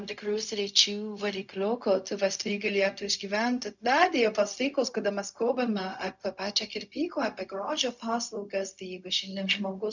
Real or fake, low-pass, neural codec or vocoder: fake; 7.2 kHz; codec, 16 kHz, 0.4 kbps, LongCat-Audio-Codec